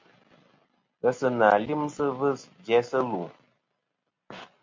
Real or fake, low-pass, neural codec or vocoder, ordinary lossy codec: real; 7.2 kHz; none; MP3, 48 kbps